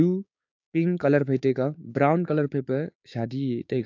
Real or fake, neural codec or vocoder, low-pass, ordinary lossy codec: fake; codec, 16 kHz, 6 kbps, DAC; 7.2 kHz; AAC, 48 kbps